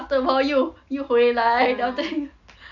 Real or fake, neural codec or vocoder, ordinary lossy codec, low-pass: real; none; none; 7.2 kHz